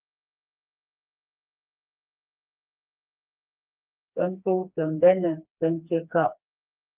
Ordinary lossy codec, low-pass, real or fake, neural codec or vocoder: Opus, 24 kbps; 3.6 kHz; fake; codec, 16 kHz, 2 kbps, FreqCodec, smaller model